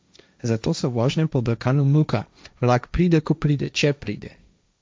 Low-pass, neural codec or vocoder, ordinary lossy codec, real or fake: none; codec, 16 kHz, 1.1 kbps, Voila-Tokenizer; none; fake